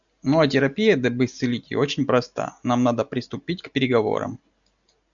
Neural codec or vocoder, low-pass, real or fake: none; 7.2 kHz; real